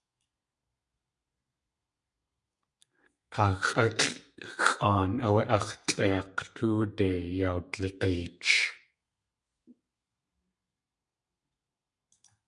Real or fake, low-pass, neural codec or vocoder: fake; 10.8 kHz; codec, 32 kHz, 1.9 kbps, SNAC